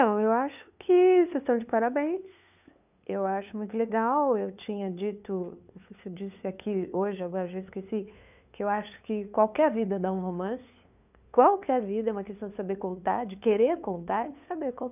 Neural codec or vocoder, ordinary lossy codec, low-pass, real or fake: codec, 16 kHz, 2 kbps, FunCodec, trained on LibriTTS, 25 frames a second; none; 3.6 kHz; fake